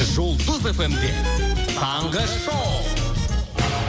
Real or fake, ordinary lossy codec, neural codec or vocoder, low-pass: real; none; none; none